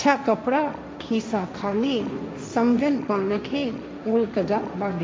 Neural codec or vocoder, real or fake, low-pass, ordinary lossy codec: codec, 16 kHz, 1.1 kbps, Voila-Tokenizer; fake; none; none